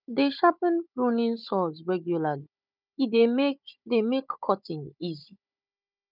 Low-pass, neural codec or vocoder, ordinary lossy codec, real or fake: 5.4 kHz; codec, 16 kHz, 16 kbps, FunCodec, trained on Chinese and English, 50 frames a second; none; fake